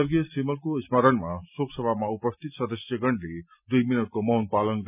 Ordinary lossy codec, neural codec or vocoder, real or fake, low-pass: none; none; real; 3.6 kHz